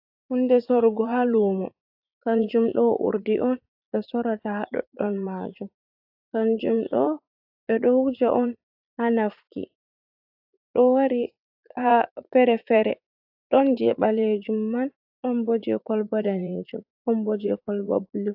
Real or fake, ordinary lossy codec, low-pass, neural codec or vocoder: fake; AAC, 48 kbps; 5.4 kHz; vocoder, 44.1 kHz, 80 mel bands, Vocos